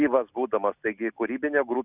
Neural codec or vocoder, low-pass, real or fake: none; 3.6 kHz; real